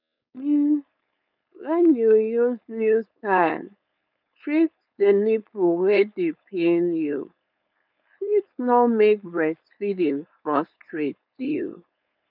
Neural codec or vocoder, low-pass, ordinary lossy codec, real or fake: codec, 16 kHz, 4.8 kbps, FACodec; 5.4 kHz; none; fake